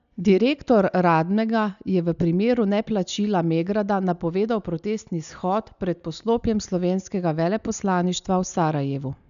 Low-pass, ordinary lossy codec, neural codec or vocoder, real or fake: 7.2 kHz; none; none; real